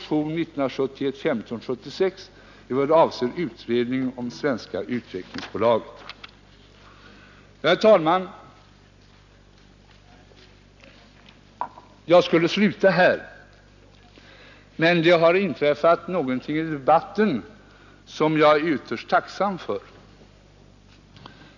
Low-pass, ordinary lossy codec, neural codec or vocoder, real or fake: 7.2 kHz; none; none; real